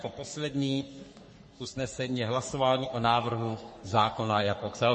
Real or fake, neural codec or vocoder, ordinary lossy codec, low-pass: fake; codec, 44.1 kHz, 3.4 kbps, Pupu-Codec; MP3, 32 kbps; 10.8 kHz